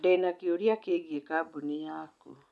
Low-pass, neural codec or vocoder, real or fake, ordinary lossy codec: none; none; real; none